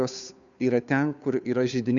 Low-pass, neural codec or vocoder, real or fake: 7.2 kHz; codec, 16 kHz, 2 kbps, FunCodec, trained on Chinese and English, 25 frames a second; fake